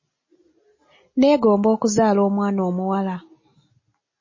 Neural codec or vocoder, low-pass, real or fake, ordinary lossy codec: none; 7.2 kHz; real; MP3, 32 kbps